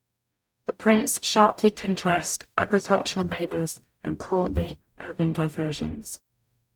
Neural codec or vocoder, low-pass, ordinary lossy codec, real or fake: codec, 44.1 kHz, 0.9 kbps, DAC; 19.8 kHz; none; fake